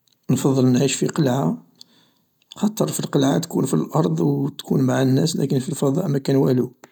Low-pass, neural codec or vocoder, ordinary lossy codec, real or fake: 19.8 kHz; none; none; real